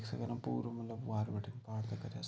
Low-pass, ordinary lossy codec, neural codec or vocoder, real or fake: none; none; none; real